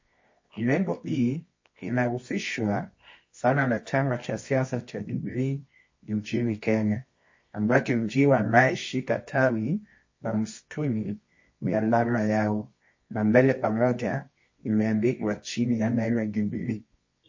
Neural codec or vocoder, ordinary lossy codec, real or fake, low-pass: codec, 24 kHz, 0.9 kbps, WavTokenizer, medium music audio release; MP3, 32 kbps; fake; 7.2 kHz